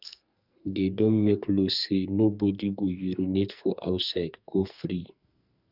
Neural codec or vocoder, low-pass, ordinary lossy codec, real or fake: codec, 44.1 kHz, 2.6 kbps, SNAC; 5.4 kHz; none; fake